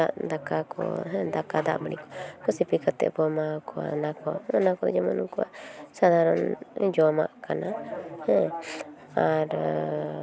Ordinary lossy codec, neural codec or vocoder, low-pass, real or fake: none; none; none; real